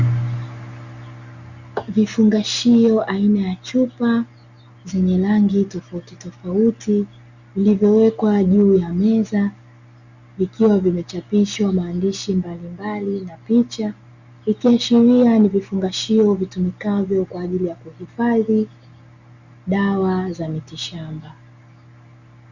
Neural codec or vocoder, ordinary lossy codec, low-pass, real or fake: none; Opus, 64 kbps; 7.2 kHz; real